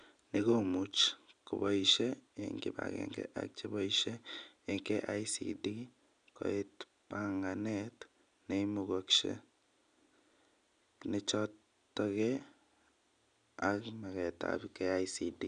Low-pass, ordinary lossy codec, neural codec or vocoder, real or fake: 9.9 kHz; none; none; real